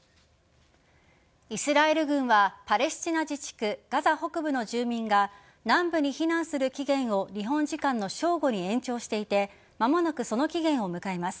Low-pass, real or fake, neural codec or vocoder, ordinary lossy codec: none; real; none; none